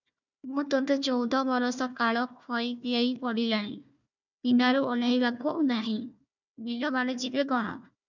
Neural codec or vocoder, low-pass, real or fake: codec, 16 kHz, 1 kbps, FunCodec, trained on Chinese and English, 50 frames a second; 7.2 kHz; fake